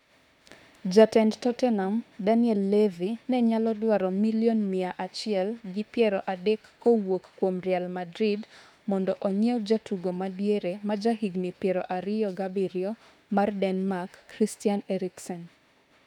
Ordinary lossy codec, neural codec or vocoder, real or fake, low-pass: none; autoencoder, 48 kHz, 32 numbers a frame, DAC-VAE, trained on Japanese speech; fake; 19.8 kHz